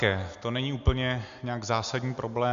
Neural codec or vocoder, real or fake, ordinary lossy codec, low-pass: none; real; MP3, 64 kbps; 7.2 kHz